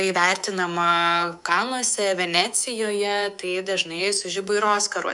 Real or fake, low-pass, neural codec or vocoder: fake; 10.8 kHz; codec, 24 kHz, 3.1 kbps, DualCodec